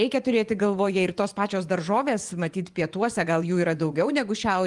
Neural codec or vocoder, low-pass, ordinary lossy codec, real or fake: vocoder, 24 kHz, 100 mel bands, Vocos; 10.8 kHz; Opus, 24 kbps; fake